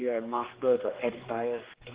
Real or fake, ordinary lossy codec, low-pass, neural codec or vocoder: fake; Opus, 16 kbps; 3.6 kHz; codec, 16 kHz, 2 kbps, X-Codec, HuBERT features, trained on general audio